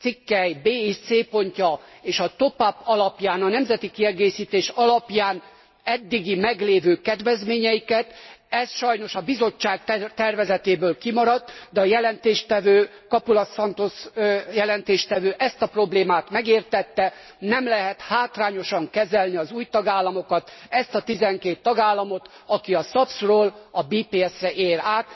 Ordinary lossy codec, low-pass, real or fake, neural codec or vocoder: MP3, 24 kbps; 7.2 kHz; real; none